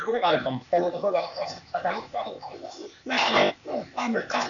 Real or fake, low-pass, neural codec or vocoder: fake; 7.2 kHz; codec, 16 kHz, 0.8 kbps, ZipCodec